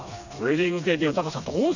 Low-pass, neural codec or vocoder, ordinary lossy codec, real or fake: 7.2 kHz; codec, 16 kHz, 2 kbps, FreqCodec, smaller model; none; fake